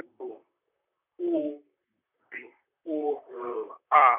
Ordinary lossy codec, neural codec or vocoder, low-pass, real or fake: none; vocoder, 44.1 kHz, 128 mel bands, Pupu-Vocoder; 3.6 kHz; fake